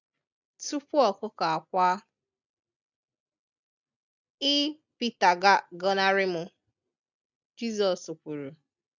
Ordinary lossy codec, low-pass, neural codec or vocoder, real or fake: none; 7.2 kHz; none; real